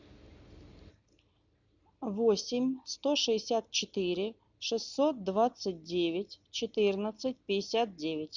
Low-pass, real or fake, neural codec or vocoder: 7.2 kHz; real; none